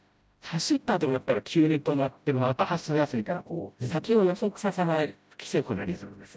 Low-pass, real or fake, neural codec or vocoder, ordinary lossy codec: none; fake; codec, 16 kHz, 0.5 kbps, FreqCodec, smaller model; none